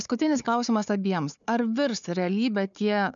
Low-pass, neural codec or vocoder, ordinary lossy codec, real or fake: 7.2 kHz; codec, 16 kHz, 4 kbps, FunCodec, trained on Chinese and English, 50 frames a second; MP3, 96 kbps; fake